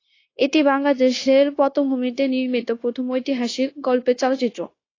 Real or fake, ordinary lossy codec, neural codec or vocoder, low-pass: fake; AAC, 32 kbps; codec, 16 kHz, 0.9 kbps, LongCat-Audio-Codec; 7.2 kHz